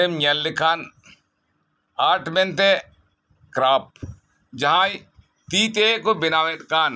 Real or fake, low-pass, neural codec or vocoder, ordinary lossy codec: real; none; none; none